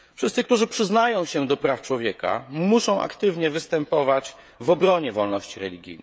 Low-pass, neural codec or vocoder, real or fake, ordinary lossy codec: none; codec, 16 kHz, 16 kbps, FreqCodec, smaller model; fake; none